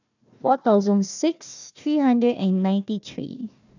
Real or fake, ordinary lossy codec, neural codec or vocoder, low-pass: fake; none; codec, 16 kHz, 1 kbps, FunCodec, trained on Chinese and English, 50 frames a second; 7.2 kHz